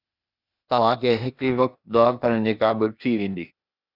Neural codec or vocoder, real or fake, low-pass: codec, 16 kHz, 0.8 kbps, ZipCodec; fake; 5.4 kHz